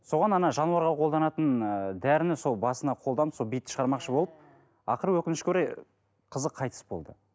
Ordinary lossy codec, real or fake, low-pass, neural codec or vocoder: none; real; none; none